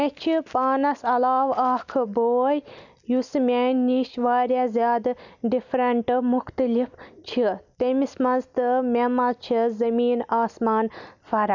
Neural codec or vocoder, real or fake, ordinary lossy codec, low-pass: none; real; none; 7.2 kHz